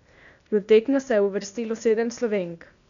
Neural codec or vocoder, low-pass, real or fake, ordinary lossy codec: codec, 16 kHz, 0.8 kbps, ZipCodec; 7.2 kHz; fake; none